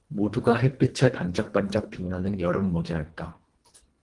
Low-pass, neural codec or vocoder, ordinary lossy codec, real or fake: 10.8 kHz; codec, 24 kHz, 1.5 kbps, HILCodec; Opus, 24 kbps; fake